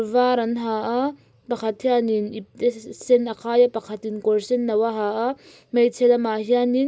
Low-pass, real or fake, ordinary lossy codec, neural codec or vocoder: none; real; none; none